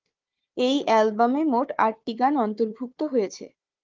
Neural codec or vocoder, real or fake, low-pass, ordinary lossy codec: codec, 16 kHz, 4 kbps, FunCodec, trained on Chinese and English, 50 frames a second; fake; 7.2 kHz; Opus, 32 kbps